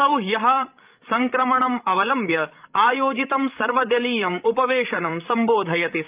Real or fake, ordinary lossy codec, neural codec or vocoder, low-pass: fake; Opus, 32 kbps; codec, 16 kHz, 16 kbps, FreqCodec, larger model; 3.6 kHz